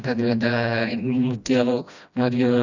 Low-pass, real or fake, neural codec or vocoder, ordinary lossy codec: 7.2 kHz; fake; codec, 16 kHz, 1 kbps, FreqCodec, smaller model; none